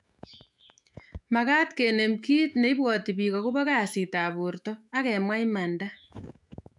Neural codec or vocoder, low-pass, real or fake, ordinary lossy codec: autoencoder, 48 kHz, 128 numbers a frame, DAC-VAE, trained on Japanese speech; 10.8 kHz; fake; none